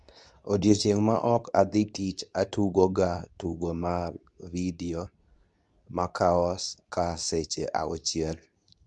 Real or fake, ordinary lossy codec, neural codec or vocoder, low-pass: fake; none; codec, 24 kHz, 0.9 kbps, WavTokenizer, medium speech release version 2; 10.8 kHz